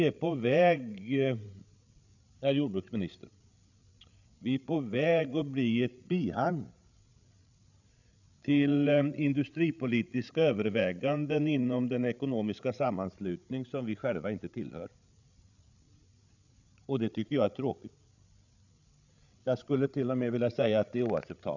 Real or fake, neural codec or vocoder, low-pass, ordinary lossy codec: fake; codec, 16 kHz, 8 kbps, FreqCodec, larger model; 7.2 kHz; none